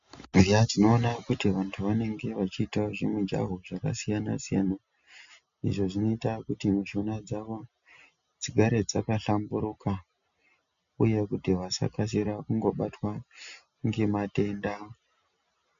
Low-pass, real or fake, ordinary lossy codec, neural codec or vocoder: 7.2 kHz; real; MP3, 64 kbps; none